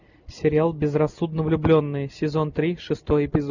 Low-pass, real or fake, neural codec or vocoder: 7.2 kHz; real; none